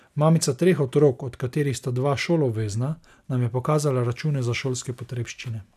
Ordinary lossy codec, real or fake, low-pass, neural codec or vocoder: none; real; 14.4 kHz; none